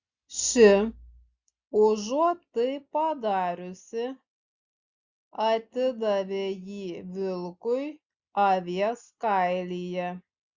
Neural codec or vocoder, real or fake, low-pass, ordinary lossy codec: none; real; 7.2 kHz; Opus, 64 kbps